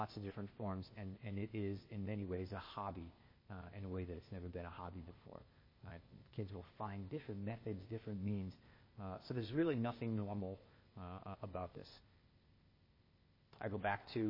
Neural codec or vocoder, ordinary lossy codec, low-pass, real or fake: codec, 16 kHz, 0.8 kbps, ZipCodec; MP3, 24 kbps; 5.4 kHz; fake